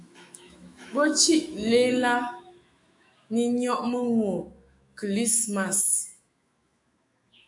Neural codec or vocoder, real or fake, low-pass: autoencoder, 48 kHz, 128 numbers a frame, DAC-VAE, trained on Japanese speech; fake; 10.8 kHz